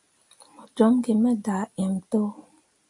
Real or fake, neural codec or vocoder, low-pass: real; none; 10.8 kHz